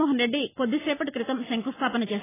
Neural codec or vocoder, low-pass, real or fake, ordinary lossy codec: none; 3.6 kHz; real; AAC, 16 kbps